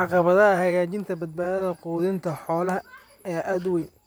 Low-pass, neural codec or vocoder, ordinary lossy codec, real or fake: none; vocoder, 44.1 kHz, 128 mel bands, Pupu-Vocoder; none; fake